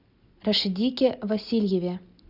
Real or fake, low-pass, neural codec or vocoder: real; 5.4 kHz; none